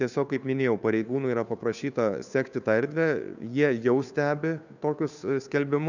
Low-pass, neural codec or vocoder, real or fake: 7.2 kHz; codec, 16 kHz, 2 kbps, FunCodec, trained on Chinese and English, 25 frames a second; fake